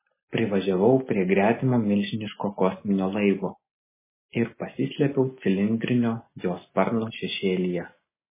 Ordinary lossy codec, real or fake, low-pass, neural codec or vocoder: MP3, 16 kbps; real; 3.6 kHz; none